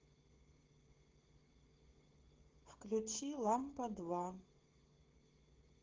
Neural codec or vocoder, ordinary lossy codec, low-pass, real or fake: codec, 16 kHz, 16 kbps, FreqCodec, smaller model; Opus, 16 kbps; 7.2 kHz; fake